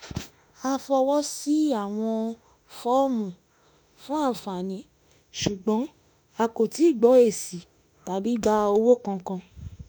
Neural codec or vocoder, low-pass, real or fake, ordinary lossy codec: autoencoder, 48 kHz, 32 numbers a frame, DAC-VAE, trained on Japanese speech; none; fake; none